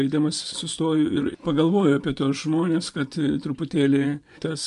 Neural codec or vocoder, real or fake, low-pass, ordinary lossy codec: vocoder, 24 kHz, 100 mel bands, Vocos; fake; 10.8 kHz; MP3, 64 kbps